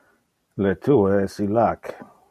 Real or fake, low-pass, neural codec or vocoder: real; 14.4 kHz; none